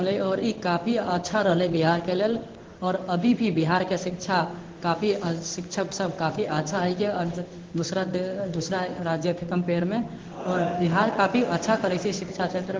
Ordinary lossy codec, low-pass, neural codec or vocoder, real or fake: Opus, 16 kbps; 7.2 kHz; codec, 16 kHz in and 24 kHz out, 1 kbps, XY-Tokenizer; fake